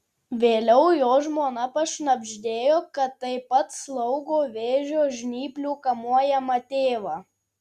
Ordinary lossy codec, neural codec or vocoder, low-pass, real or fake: Opus, 64 kbps; none; 14.4 kHz; real